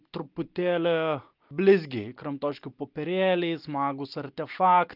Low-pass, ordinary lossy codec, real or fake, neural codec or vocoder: 5.4 kHz; Opus, 24 kbps; real; none